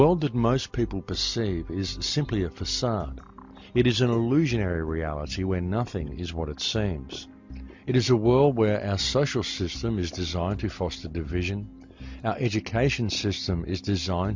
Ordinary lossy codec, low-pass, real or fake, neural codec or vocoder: MP3, 64 kbps; 7.2 kHz; real; none